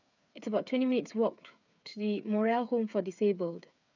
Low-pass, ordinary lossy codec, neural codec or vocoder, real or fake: 7.2 kHz; none; codec, 16 kHz, 8 kbps, FreqCodec, smaller model; fake